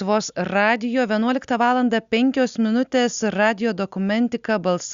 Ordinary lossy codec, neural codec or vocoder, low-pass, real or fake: Opus, 64 kbps; none; 7.2 kHz; real